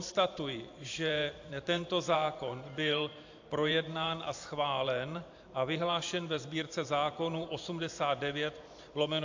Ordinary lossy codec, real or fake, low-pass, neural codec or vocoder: AAC, 48 kbps; fake; 7.2 kHz; vocoder, 44.1 kHz, 128 mel bands every 512 samples, BigVGAN v2